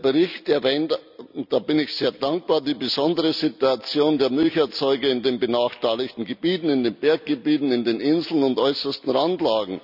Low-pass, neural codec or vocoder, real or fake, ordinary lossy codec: 5.4 kHz; none; real; none